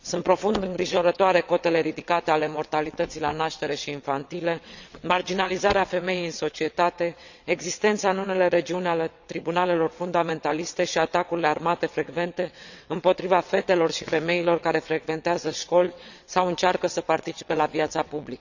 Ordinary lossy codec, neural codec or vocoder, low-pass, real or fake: none; vocoder, 22.05 kHz, 80 mel bands, WaveNeXt; 7.2 kHz; fake